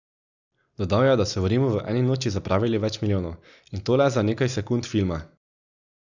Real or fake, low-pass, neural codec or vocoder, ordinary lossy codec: real; 7.2 kHz; none; none